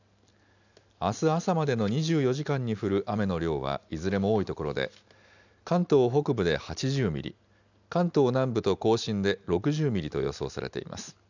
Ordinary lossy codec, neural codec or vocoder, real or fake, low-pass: none; none; real; 7.2 kHz